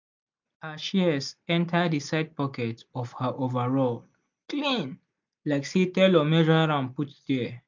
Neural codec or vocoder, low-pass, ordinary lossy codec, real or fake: none; 7.2 kHz; MP3, 64 kbps; real